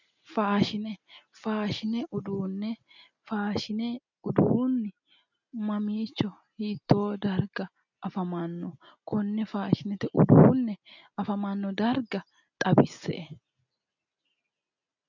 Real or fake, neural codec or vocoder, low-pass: real; none; 7.2 kHz